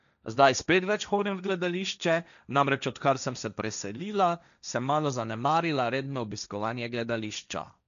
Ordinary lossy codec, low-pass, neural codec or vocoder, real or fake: none; 7.2 kHz; codec, 16 kHz, 1.1 kbps, Voila-Tokenizer; fake